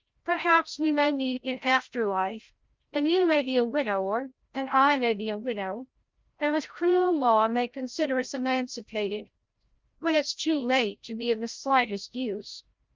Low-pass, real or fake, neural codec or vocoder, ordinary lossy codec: 7.2 kHz; fake; codec, 16 kHz, 0.5 kbps, FreqCodec, larger model; Opus, 32 kbps